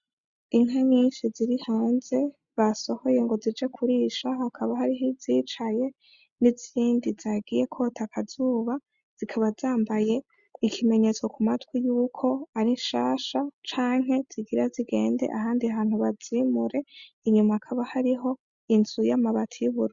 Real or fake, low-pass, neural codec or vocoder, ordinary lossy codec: real; 7.2 kHz; none; Opus, 64 kbps